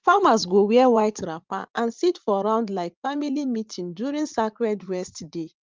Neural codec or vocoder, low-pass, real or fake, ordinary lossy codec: vocoder, 44.1 kHz, 80 mel bands, Vocos; 7.2 kHz; fake; Opus, 24 kbps